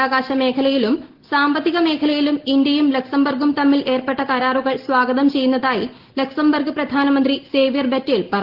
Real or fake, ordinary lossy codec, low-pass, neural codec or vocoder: real; Opus, 16 kbps; 5.4 kHz; none